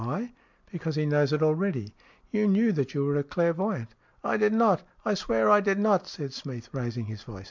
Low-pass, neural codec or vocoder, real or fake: 7.2 kHz; none; real